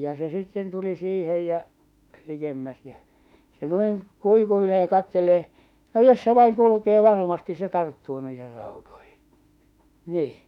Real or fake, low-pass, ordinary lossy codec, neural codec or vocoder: fake; 19.8 kHz; none; autoencoder, 48 kHz, 32 numbers a frame, DAC-VAE, trained on Japanese speech